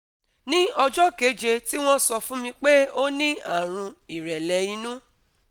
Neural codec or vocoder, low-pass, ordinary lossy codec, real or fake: none; none; none; real